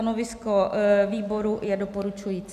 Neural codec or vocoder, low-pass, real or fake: none; 14.4 kHz; real